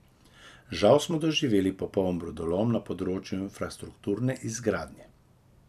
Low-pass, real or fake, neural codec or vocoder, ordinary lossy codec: 14.4 kHz; fake; vocoder, 44.1 kHz, 128 mel bands every 256 samples, BigVGAN v2; none